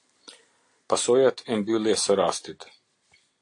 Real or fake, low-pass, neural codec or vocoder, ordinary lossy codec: real; 9.9 kHz; none; AAC, 48 kbps